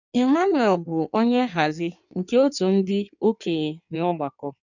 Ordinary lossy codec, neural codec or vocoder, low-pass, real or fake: none; codec, 16 kHz in and 24 kHz out, 1.1 kbps, FireRedTTS-2 codec; 7.2 kHz; fake